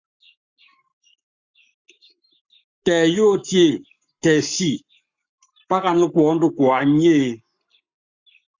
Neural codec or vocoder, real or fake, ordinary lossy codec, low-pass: codec, 44.1 kHz, 7.8 kbps, Pupu-Codec; fake; Opus, 64 kbps; 7.2 kHz